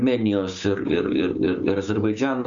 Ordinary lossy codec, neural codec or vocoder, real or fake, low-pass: Opus, 64 kbps; codec, 16 kHz, 6 kbps, DAC; fake; 7.2 kHz